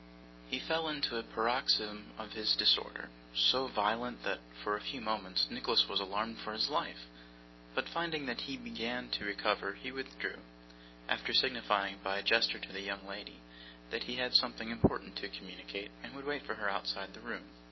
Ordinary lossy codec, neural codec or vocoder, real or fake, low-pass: MP3, 24 kbps; none; real; 7.2 kHz